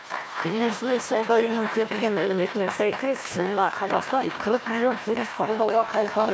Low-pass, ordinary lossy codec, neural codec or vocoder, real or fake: none; none; codec, 16 kHz, 1 kbps, FunCodec, trained on Chinese and English, 50 frames a second; fake